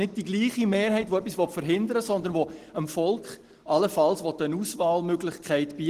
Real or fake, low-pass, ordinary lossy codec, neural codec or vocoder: real; 14.4 kHz; Opus, 16 kbps; none